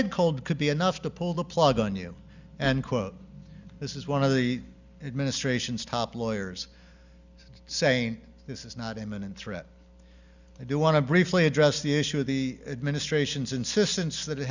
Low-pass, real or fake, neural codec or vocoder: 7.2 kHz; real; none